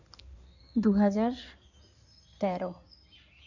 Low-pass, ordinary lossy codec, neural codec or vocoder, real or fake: 7.2 kHz; none; codec, 16 kHz in and 24 kHz out, 2.2 kbps, FireRedTTS-2 codec; fake